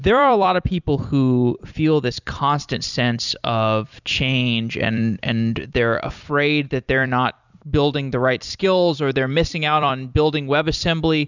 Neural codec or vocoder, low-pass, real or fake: vocoder, 44.1 kHz, 128 mel bands every 256 samples, BigVGAN v2; 7.2 kHz; fake